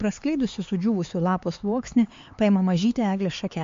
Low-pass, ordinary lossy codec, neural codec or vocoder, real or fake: 7.2 kHz; MP3, 48 kbps; codec, 16 kHz, 4 kbps, X-Codec, HuBERT features, trained on LibriSpeech; fake